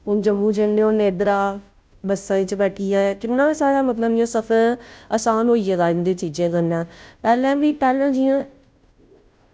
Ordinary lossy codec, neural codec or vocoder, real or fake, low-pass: none; codec, 16 kHz, 0.5 kbps, FunCodec, trained on Chinese and English, 25 frames a second; fake; none